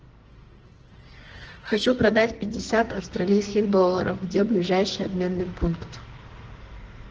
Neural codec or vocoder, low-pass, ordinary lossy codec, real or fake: codec, 44.1 kHz, 2.6 kbps, SNAC; 7.2 kHz; Opus, 16 kbps; fake